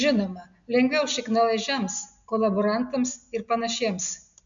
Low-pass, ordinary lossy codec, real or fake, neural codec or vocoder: 7.2 kHz; MP3, 64 kbps; real; none